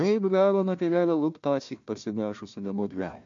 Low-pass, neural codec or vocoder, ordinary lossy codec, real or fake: 7.2 kHz; codec, 16 kHz, 1 kbps, FunCodec, trained on Chinese and English, 50 frames a second; MP3, 48 kbps; fake